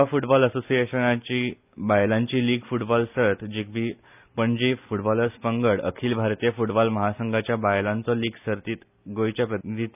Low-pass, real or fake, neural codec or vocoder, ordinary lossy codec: 3.6 kHz; real; none; none